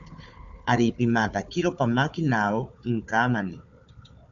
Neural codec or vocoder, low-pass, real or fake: codec, 16 kHz, 4 kbps, FunCodec, trained on Chinese and English, 50 frames a second; 7.2 kHz; fake